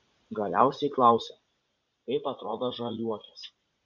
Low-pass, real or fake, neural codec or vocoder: 7.2 kHz; fake; vocoder, 44.1 kHz, 80 mel bands, Vocos